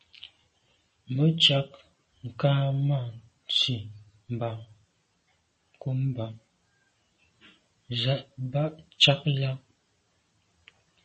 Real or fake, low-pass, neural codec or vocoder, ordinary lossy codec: real; 10.8 kHz; none; MP3, 32 kbps